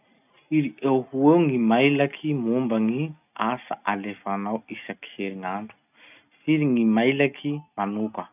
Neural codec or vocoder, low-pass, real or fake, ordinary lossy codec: none; 3.6 kHz; real; none